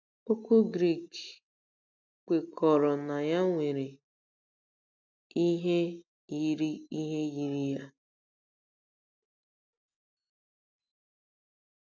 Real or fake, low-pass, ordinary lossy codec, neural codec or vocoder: real; 7.2 kHz; none; none